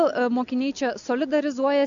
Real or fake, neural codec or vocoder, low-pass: real; none; 7.2 kHz